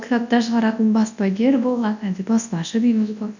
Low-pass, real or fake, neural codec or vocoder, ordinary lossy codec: 7.2 kHz; fake; codec, 24 kHz, 0.9 kbps, WavTokenizer, large speech release; none